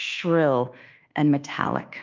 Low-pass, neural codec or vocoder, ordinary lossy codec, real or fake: 7.2 kHz; codec, 24 kHz, 1.2 kbps, DualCodec; Opus, 24 kbps; fake